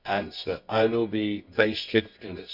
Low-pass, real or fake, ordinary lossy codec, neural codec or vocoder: 5.4 kHz; fake; none; codec, 24 kHz, 0.9 kbps, WavTokenizer, medium music audio release